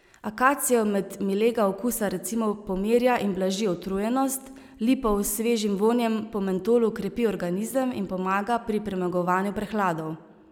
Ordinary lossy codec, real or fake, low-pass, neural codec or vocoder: none; real; 19.8 kHz; none